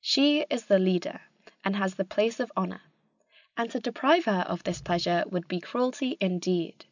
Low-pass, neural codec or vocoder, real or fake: 7.2 kHz; none; real